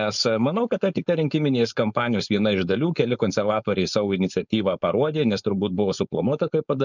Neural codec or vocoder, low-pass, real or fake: codec, 16 kHz, 4.8 kbps, FACodec; 7.2 kHz; fake